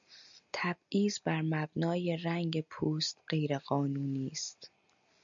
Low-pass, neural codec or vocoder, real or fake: 7.2 kHz; none; real